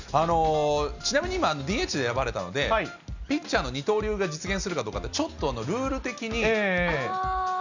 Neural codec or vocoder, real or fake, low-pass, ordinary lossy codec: none; real; 7.2 kHz; none